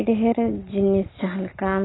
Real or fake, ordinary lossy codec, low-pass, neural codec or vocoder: real; AAC, 16 kbps; 7.2 kHz; none